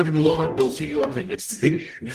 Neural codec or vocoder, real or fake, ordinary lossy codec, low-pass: codec, 44.1 kHz, 0.9 kbps, DAC; fake; Opus, 24 kbps; 14.4 kHz